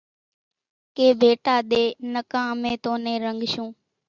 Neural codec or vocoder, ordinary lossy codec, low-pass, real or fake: none; Opus, 64 kbps; 7.2 kHz; real